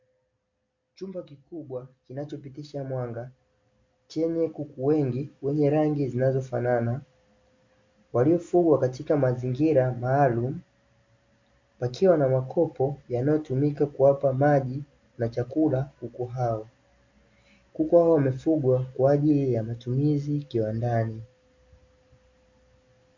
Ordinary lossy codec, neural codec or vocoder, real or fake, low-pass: MP3, 64 kbps; none; real; 7.2 kHz